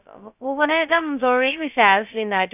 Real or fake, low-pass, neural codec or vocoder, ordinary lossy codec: fake; 3.6 kHz; codec, 16 kHz, 0.2 kbps, FocalCodec; none